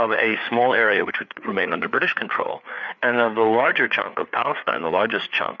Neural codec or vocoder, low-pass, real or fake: codec, 16 kHz, 4 kbps, FreqCodec, larger model; 7.2 kHz; fake